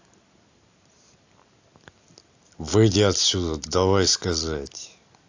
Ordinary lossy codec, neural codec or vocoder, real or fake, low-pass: AAC, 48 kbps; none; real; 7.2 kHz